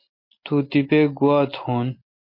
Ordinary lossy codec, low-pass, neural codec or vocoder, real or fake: MP3, 32 kbps; 5.4 kHz; none; real